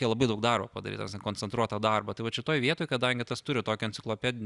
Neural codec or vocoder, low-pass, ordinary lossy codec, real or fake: none; 10.8 kHz; Opus, 64 kbps; real